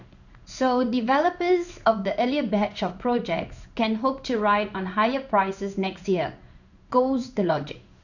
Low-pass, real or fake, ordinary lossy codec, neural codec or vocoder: 7.2 kHz; fake; none; codec, 16 kHz in and 24 kHz out, 1 kbps, XY-Tokenizer